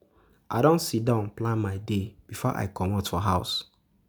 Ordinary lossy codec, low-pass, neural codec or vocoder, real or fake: none; none; none; real